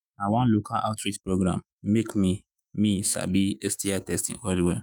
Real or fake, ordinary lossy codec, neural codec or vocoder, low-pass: fake; none; autoencoder, 48 kHz, 128 numbers a frame, DAC-VAE, trained on Japanese speech; none